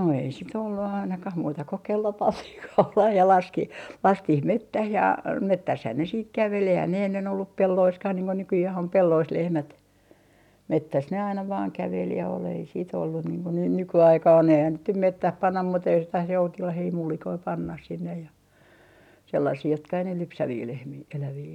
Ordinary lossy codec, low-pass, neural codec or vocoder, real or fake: none; 19.8 kHz; none; real